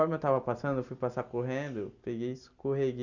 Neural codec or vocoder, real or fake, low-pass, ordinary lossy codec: none; real; 7.2 kHz; none